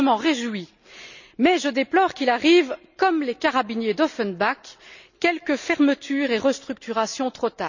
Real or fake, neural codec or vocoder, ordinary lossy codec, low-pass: real; none; none; 7.2 kHz